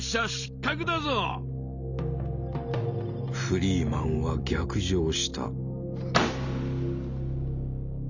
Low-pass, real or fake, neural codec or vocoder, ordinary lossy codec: 7.2 kHz; real; none; none